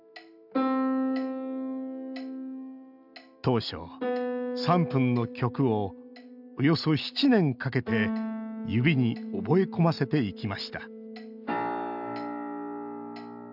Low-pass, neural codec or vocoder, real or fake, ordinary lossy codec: 5.4 kHz; none; real; none